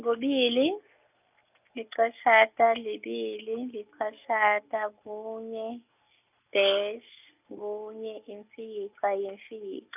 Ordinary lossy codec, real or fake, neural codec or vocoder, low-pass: none; real; none; 3.6 kHz